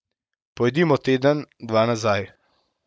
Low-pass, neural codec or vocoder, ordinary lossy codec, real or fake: none; none; none; real